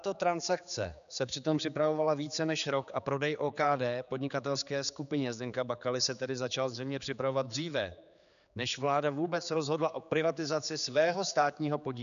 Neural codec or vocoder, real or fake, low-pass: codec, 16 kHz, 4 kbps, X-Codec, HuBERT features, trained on general audio; fake; 7.2 kHz